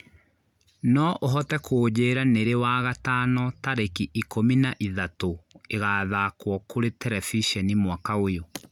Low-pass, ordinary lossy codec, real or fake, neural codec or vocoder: 19.8 kHz; none; real; none